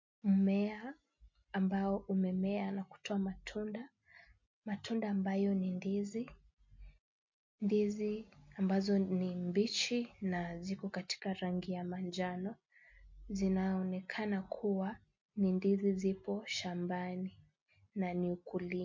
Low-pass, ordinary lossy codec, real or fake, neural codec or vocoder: 7.2 kHz; MP3, 48 kbps; real; none